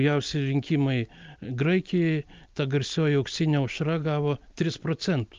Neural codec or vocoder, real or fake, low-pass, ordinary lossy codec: none; real; 7.2 kHz; Opus, 24 kbps